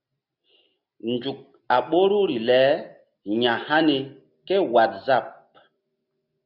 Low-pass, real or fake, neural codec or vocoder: 5.4 kHz; real; none